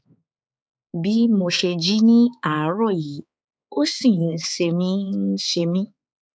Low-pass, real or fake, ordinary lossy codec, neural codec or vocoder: none; fake; none; codec, 16 kHz, 4 kbps, X-Codec, HuBERT features, trained on balanced general audio